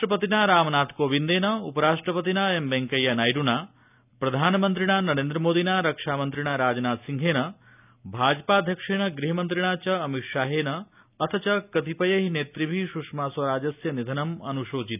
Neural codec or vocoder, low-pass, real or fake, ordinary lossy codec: none; 3.6 kHz; real; none